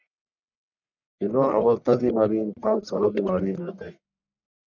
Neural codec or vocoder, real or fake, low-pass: codec, 44.1 kHz, 1.7 kbps, Pupu-Codec; fake; 7.2 kHz